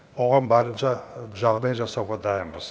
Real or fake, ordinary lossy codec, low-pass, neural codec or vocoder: fake; none; none; codec, 16 kHz, 0.8 kbps, ZipCodec